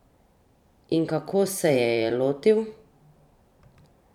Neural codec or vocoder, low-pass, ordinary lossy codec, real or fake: vocoder, 44.1 kHz, 128 mel bands every 512 samples, BigVGAN v2; 19.8 kHz; none; fake